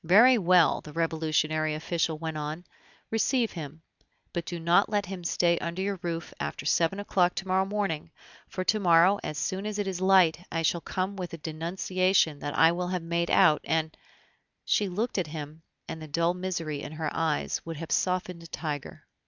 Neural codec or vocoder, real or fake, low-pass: none; real; 7.2 kHz